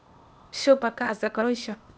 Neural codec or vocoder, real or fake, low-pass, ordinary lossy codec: codec, 16 kHz, 0.8 kbps, ZipCodec; fake; none; none